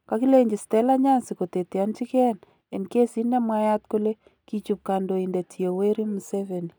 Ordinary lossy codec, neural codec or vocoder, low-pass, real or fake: none; none; none; real